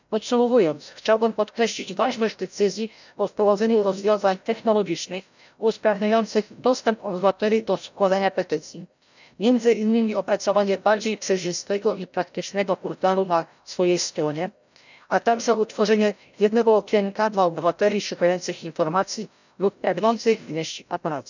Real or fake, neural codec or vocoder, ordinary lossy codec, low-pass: fake; codec, 16 kHz, 0.5 kbps, FreqCodec, larger model; none; 7.2 kHz